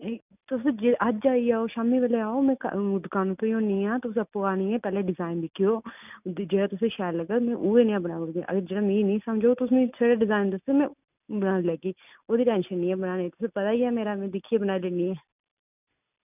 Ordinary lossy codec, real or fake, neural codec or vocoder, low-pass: none; real; none; 3.6 kHz